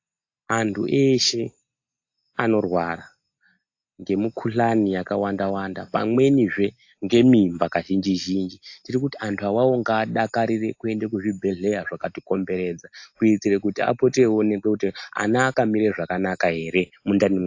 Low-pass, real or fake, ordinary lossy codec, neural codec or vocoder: 7.2 kHz; real; AAC, 48 kbps; none